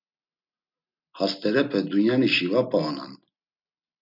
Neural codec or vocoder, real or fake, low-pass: none; real; 5.4 kHz